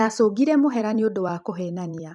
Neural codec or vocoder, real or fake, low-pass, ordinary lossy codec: vocoder, 44.1 kHz, 128 mel bands every 256 samples, BigVGAN v2; fake; 10.8 kHz; none